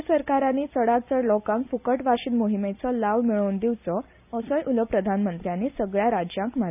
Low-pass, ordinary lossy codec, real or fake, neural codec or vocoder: 3.6 kHz; none; real; none